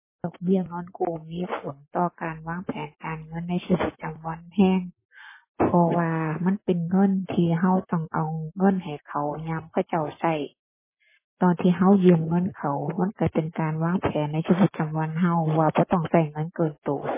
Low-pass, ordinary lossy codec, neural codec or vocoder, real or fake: 3.6 kHz; MP3, 16 kbps; codec, 44.1 kHz, 7.8 kbps, Pupu-Codec; fake